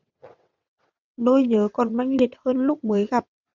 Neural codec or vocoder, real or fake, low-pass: vocoder, 22.05 kHz, 80 mel bands, WaveNeXt; fake; 7.2 kHz